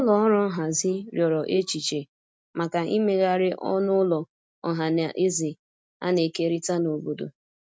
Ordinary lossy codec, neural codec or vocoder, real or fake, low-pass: none; none; real; none